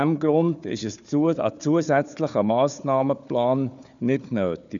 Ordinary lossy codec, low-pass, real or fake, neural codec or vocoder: MP3, 96 kbps; 7.2 kHz; fake; codec, 16 kHz, 4 kbps, FunCodec, trained on Chinese and English, 50 frames a second